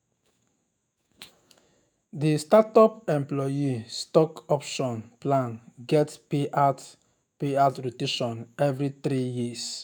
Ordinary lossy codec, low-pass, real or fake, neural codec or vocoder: none; none; fake; autoencoder, 48 kHz, 128 numbers a frame, DAC-VAE, trained on Japanese speech